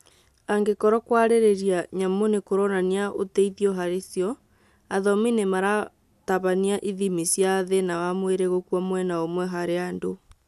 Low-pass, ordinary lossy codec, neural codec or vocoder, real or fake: 14.4 kHz; none; none; real